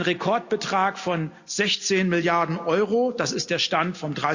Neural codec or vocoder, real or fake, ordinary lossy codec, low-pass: none; real; Opus, 64 kbps; 7.2 kHz